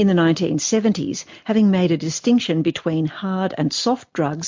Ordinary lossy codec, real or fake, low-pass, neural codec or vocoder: MP3, 48 kbps; real; 7.2 kHz; none